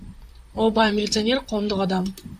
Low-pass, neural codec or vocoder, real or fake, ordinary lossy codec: 14.4 kHz; vocoder, 44.1 kHz, 128 mel bands, Pupu-Vocoder; fake; AAC, 96 kbps